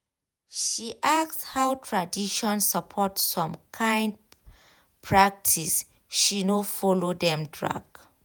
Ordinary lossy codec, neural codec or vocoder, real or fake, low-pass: none; vocoder, 48 kHz, 128 mel bands, Vocos; fake; none